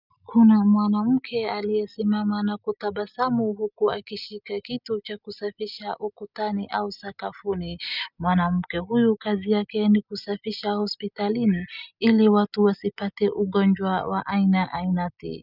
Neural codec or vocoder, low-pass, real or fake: none; 5.4 kHz; real